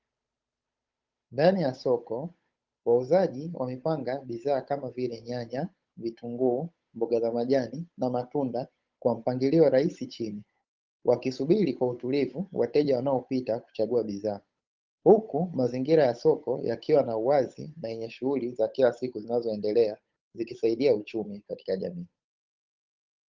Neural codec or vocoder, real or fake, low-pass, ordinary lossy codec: codec, 16 kHz, 8 kbps, FunCodec, trained on Chinese and English, 25 frames a second; fake; 7.2 kHz; Opus, 24 kbps